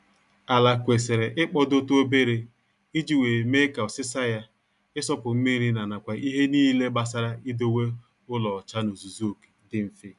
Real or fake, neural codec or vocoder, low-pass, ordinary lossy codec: real; none; 10.8 kHz; none